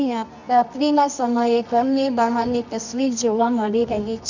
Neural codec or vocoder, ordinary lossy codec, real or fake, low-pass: codec, 24 kHz, 0.9 kbps, WavTokenizer, medium music audio release; none; fake; 7.2 kHz